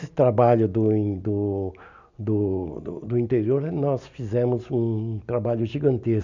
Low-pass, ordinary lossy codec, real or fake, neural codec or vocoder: 7.2 kHz; none; real; none